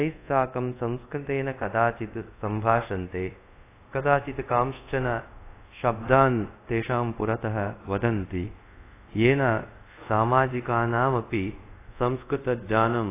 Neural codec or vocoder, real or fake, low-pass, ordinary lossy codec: codec, 24 kHz, 0.5 kbps, DualCodec; fake; 3.6 kHz; AAC, 24 kbps